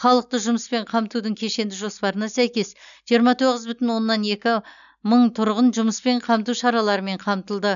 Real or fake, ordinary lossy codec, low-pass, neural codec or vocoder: real; none; 7.2 kHz; none